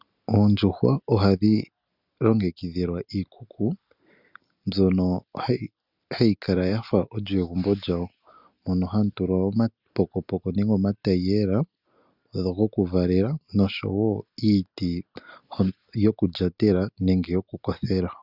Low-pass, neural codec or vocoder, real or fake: 5.4 kHz; none; real